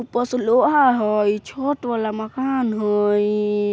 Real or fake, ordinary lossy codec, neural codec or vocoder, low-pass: real; none; none; none